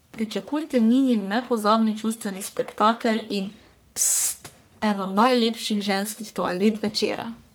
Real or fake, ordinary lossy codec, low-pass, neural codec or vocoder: fake; none; none; codec, 44.1 kHz, 1.7 kbps, Pupu-Codec